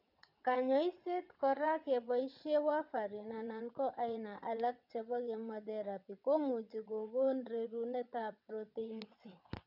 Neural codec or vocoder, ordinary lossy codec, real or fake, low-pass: vocoder, 22.05 kHz, 80 mel bands, WaveNeXt; none; fake; 5.4 kHz